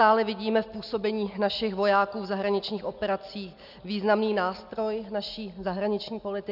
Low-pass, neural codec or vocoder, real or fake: 5.4 kHz; none; real